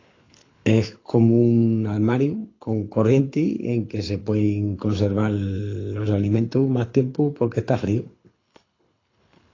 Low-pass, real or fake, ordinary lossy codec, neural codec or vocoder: 7.2 kHz; fake; AAC, 32 kbps; codec, 24 kHz, 6 kbps, HILCodec